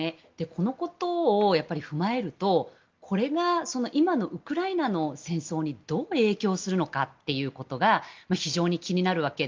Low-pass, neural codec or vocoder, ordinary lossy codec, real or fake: 7.2 kHz; none; Opus, 32 kbps; real